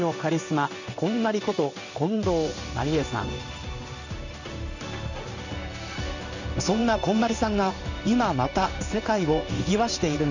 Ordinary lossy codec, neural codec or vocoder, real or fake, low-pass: none; codec, 16 kHz in and 24 kHz out, 1 kbps, XY-Tokenizer; fake; 7.2 kHz